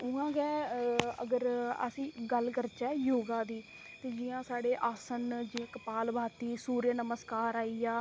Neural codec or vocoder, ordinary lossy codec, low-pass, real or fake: none; none; none; real